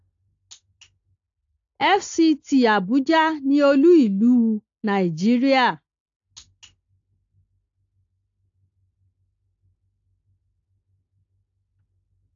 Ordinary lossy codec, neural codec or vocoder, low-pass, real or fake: AAC, 48 kbps; none; 7.2 kHz; real